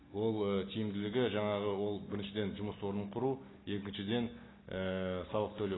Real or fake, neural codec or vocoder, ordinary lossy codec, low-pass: real; none; AAC, 16 kbps; 7.2 kHz